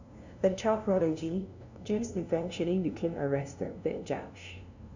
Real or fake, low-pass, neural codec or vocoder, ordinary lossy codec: fake; 7.2 kHz; codec, 16 kHz, 0.5 kbps, FunCodec, trained on LibriTTS, 25 frames a second; none